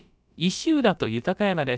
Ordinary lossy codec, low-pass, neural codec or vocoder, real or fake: none; none; codec, 16 kHz, about 1 kbps, DyCAST, with the encoder's durations; fake